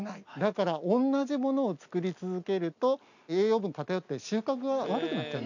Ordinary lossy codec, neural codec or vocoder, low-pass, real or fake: none; none; 7.2 kHz; real